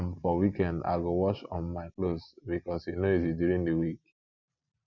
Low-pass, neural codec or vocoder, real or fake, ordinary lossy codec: 7.2 kHz; none; real; none